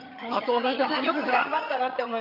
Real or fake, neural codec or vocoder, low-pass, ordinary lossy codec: fake; vocoder, 22.05 kHz, 80 mel bands, HiFi-GAN; 5.4 kHz; none